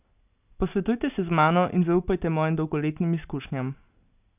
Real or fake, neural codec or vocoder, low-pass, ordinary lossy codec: real; none; 3.6 kHz; none